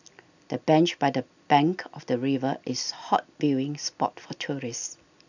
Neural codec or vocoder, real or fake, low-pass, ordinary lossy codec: none; real; 7.2 kHz; none